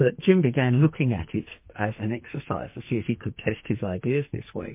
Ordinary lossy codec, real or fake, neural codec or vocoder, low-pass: MP3, 24 kbps; fake; codec, 44.1 kHz, 2.6 kbps, SNAC; 3.6 kHz